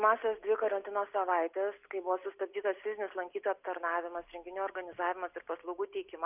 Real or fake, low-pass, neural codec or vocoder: real; 3.6 kHz; none